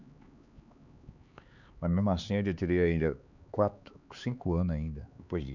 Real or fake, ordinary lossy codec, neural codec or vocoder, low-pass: fake; none; codec, 16 kHz, 4 kbps, X-Codec, HuBERT features, trained on LibriSpeech; 7.2 kHz